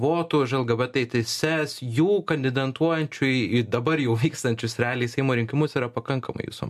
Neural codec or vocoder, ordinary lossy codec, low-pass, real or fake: none; MP3, 64 kbps; 14.4 kHz; real